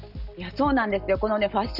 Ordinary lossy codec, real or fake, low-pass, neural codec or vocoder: none; real; 5.4 kHz; none